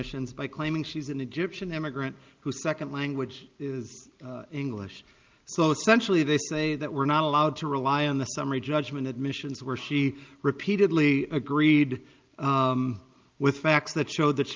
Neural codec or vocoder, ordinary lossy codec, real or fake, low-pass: none; Opus, 32 kbps; real; 7.2 kHz